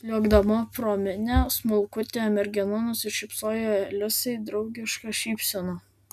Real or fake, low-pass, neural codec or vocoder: real; 14.4 kHz; none